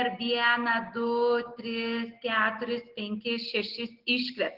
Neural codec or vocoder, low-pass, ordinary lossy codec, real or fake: none; 5.4 kHz; Opus, 16 kbps; real